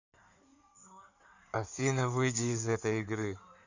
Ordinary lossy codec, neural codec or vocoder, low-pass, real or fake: none; codec, 16 kHz in and 24 kHz out, 2.2 kbps, FireRedTTS-2 codec; 7.2 kHz; fake